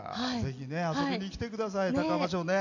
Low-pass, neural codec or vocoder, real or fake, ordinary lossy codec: 7.2 kHz; none; real; none